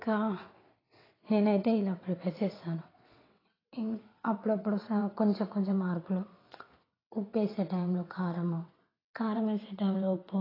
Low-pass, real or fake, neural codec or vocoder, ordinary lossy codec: 5.4 kHz; fake; vocoder, 22.05 kHz, 80 mel bands, WaveNeXt; AAC, 24 kbps